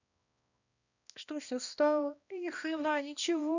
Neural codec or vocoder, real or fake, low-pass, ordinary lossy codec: codec, 16 kHz, 1 kbps, X-Codec, HuBERT features, trained on balanced general audio; fake; 7.2 kHz; none